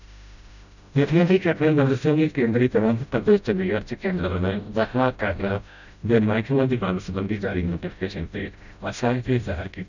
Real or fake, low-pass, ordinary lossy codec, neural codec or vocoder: fake; 7.2 kHz; none; codec, 16 kHz, 0.5 kbps, FreqCodec, smaller model